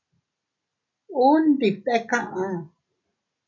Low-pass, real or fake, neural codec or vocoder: 7.2 kHz; real; none